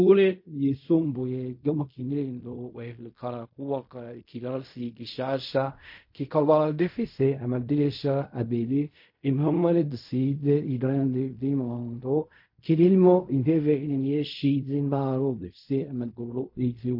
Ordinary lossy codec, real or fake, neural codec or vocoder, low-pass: MP3, 32 kbps; fake; codec, 16 kHz in and 24 kHz out, 0.4 kbps, LongCat-Audio-Codec, fine tuned four codebook decoder; 5.4 kHz